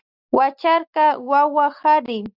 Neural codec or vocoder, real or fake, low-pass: none; real; 5.4 kHz